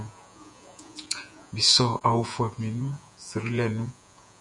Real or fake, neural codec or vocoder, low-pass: fake; vocoder, 48 kHz, 128 mel bands, Vocos; 10.8 kHz